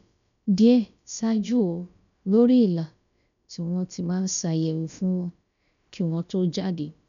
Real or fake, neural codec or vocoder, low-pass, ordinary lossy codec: fake; codec, 16 kHz, about 1 kbps, DyCAST, with the encoder's durations; 7.2 kHz; none